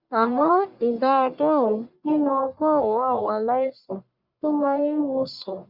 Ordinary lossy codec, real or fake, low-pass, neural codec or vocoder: Opus, 64 kbps; fake; 5.4 kHz; codec, 44.1 kHz, 1.7 kbps, Pupu-Codec